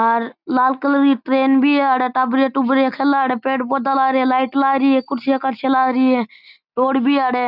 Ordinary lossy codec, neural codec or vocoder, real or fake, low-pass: none; none; real; 5.4 kHz